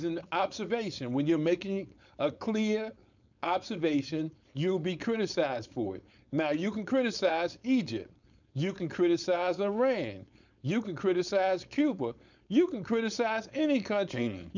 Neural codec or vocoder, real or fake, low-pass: codec, 16 kHz, 4.8 kbps, FACodec; fake; 7.2 kHz